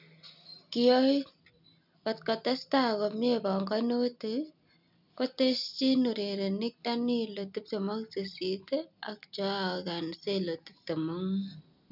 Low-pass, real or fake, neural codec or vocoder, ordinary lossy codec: 5.4 kHz; real; none; none